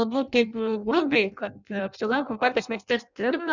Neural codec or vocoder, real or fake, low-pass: codec, 16 kHz in and 24 kHz out, 0.6 kbps, FireRedTTS-2 codec; fake; 7.2 kHz